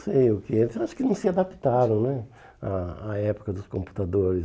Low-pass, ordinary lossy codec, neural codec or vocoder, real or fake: none; none; none; real